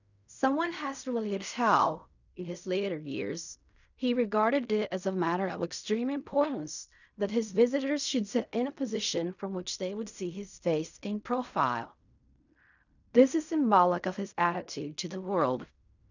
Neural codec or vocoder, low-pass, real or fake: codec, 16 kHz in and 24 kHz out, 0.4 kbps, LongCat-Audio-Codec, fine tuned four codebook decoder; 7.2 kHz; fake